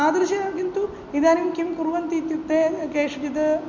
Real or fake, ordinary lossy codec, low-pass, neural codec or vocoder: real; MP3, 48 kbps; 7.2 kHz; none